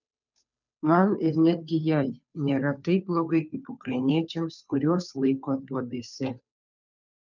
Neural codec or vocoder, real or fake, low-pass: codec, 16 kHz, 2 kbps, FunCodec, trained on Chinese and English, 25 frames a second; fake; 7.2 kHz